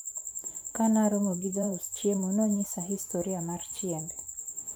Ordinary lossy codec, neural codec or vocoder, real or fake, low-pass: none; vocoder, 44.1 kHz, 128 mel bands every 512 samples, BigVGAN v2; fake; none